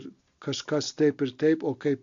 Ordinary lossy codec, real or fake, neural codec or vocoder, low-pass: AAC, 64 kbps; real; none; 7.2 kHz